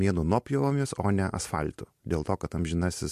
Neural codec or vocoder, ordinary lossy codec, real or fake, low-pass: none; MP3, 64 kbps; real; 14.4 kHz